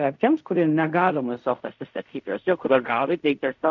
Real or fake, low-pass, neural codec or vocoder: fake; 7.2 kHz; codec, 16 kHz in and 24 kHz out, 0.4 kbps, LongCat-Audio-Codec, fine tuned four codebook decoder